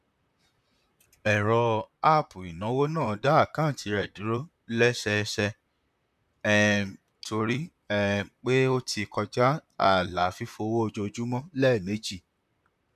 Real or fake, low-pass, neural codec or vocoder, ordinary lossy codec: fake; 14.4 kHz; vocoder, 44.1 kHz, 128 mel bands, Pupu-Vocoder; none